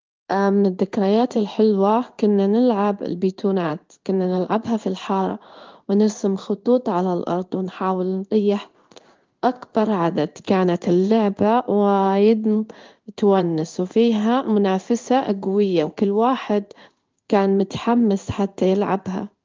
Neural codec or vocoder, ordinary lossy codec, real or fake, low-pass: codec, 16 kHz in and 24 kHz out, 1 kbps, XY-Tokenizer; Opus, 24 kbps; fake; 7.2 kHz